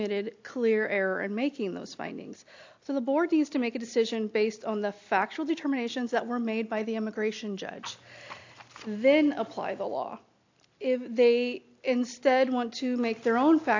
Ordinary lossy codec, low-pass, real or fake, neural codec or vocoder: AAC, 48 kbps; 7.2 kHz; real; none